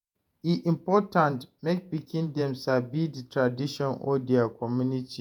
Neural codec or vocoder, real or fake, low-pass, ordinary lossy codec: vocoder, 48 kHz, 128 mel bands, Vocos; fake; 19.8 kHz; MP3, 96 kbps